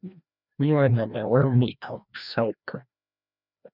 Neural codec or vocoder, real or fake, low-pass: codec, 16 kHz, 1 kbps, FreqCodec, larger model; fake; 5.4 kHz